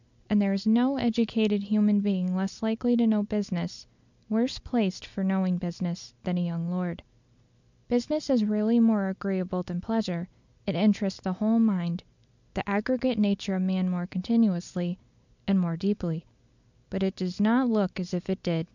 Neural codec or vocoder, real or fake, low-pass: none; real; 7.2 kHz